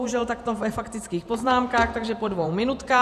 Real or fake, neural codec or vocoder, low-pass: fake; vocoder, 48 kHz, 128 mel bands, Vocos; 14.4 kHz